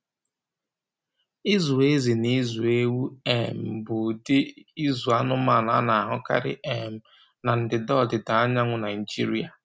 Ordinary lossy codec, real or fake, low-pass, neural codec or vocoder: none; real; none; none